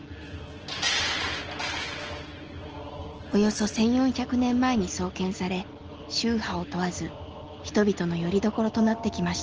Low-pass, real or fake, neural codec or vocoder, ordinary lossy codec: 7.2 kHz; real; none; Opus, 16 kbps